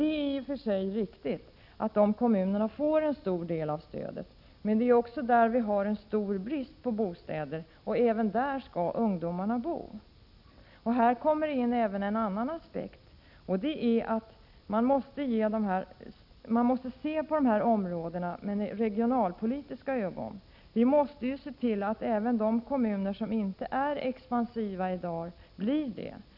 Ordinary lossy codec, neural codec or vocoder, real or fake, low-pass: none; none; real; 5.4 kHz